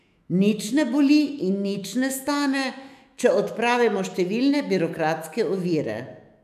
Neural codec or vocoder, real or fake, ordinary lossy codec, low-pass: autoencoder, 48 kHz, 128 numbers a frame, DAC-VAE, trained on Japanese speech; fake; none; 14.4 kHz